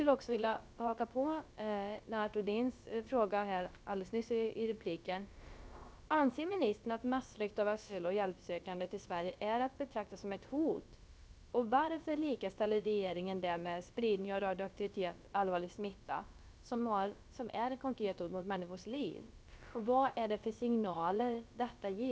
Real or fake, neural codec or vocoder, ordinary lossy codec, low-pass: fake; codec, 16 kHz, about 1 kbps, DyCAST, with the encoder's durations; none; none